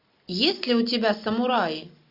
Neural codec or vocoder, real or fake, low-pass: none; real; 5.4 kHz